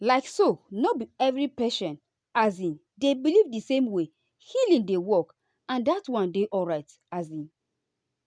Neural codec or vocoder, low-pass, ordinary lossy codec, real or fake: none; 9.9 kHz; none; real